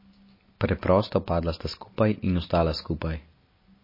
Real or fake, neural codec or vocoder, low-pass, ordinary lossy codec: real; none; 5.4 kHz; MP3, 24 kbps